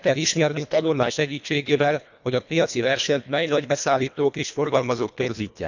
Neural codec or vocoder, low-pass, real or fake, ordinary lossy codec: codec, 24 kHz, 1.5 kbps, HILCodec; 7.2 kHz; fake; none